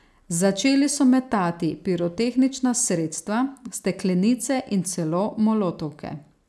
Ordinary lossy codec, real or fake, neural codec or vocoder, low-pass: none; real; none; none